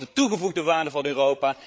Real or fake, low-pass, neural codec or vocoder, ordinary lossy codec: fake; none; codec, 16 kHz, 8 kbps, FreqCodec, larger model; none